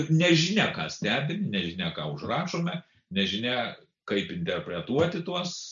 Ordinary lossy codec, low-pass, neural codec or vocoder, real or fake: MP3, 96 kbps; 7.2 kHz; none; real